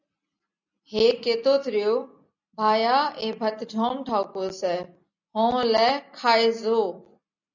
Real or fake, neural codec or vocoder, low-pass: real; none; 7.2 kHz